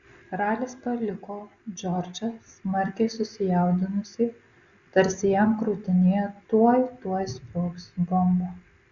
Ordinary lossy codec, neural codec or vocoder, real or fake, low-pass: Opus, 64 kbps; none; real; 7.2 kHz